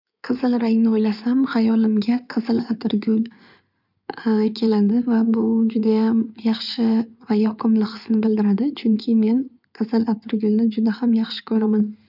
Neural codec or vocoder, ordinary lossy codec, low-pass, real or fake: codec, 16 kHz in and 24 kHz out, 2.2 kbps, FireRedTTS-2 codec; none; 5.4 kHz; fake